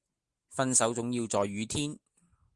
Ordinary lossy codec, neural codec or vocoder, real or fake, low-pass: Opus, 24 kbps; none; real; 10.8 kHz